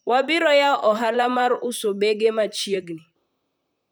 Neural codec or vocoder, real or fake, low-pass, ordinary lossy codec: vocoder, 44.1 kHz, 128 mel bands, Pupu-Vocoder; fake; none; none